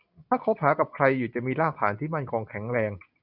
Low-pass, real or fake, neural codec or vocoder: 5.4 kHz; real; none